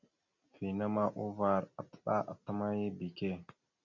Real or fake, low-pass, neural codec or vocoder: real; 7.2 kHz; none